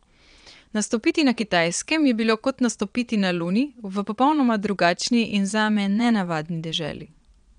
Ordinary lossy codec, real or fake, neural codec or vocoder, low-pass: none; fake; vocoder, 22.05 kHz, 80 mel bands, Vocos; 9.9 kHz